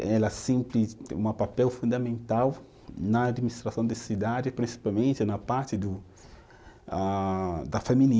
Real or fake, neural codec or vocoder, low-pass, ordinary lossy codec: real; none; none; none